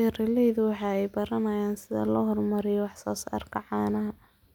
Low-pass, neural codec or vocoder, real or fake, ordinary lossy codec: 19.8 kHz; none; real; none